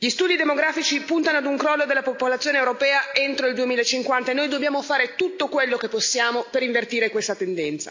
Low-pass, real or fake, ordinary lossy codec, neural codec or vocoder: 7.2 kHz; real; AAC, 48 kbps; none